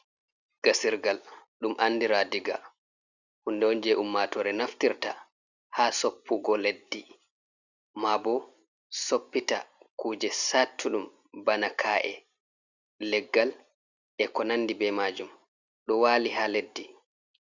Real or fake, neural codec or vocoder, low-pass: real; none; 7.2 kHz